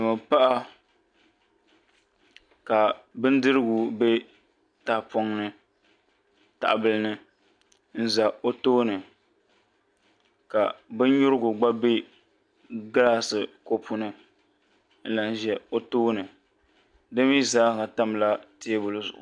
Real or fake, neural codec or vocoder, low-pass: real; none; 9.9 kHz